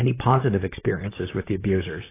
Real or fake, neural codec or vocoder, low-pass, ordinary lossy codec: fake; vocoder, 44.1 kHz, 128 mel bands, Pupu-Vocoder; 3.6 kHz; AAC, 16 kbps